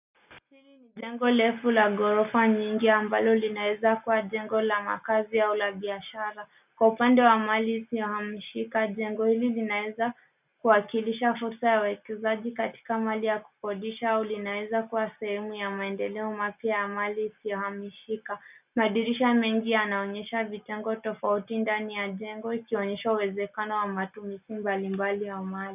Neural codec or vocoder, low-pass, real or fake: none; 3.6 kHz; real